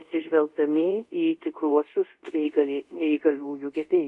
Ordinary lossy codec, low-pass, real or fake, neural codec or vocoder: MP3, 64 kbps; 10.8 kHz; fake; codec, 24 kHz, 0.5 kbps, DualCodec